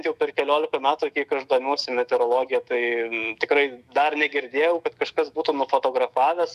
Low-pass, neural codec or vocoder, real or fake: 14.4 kHz; autoencoder, 48 kHz, 128 numbers a frame, DAC-VAE, trained on Japanese speech; fake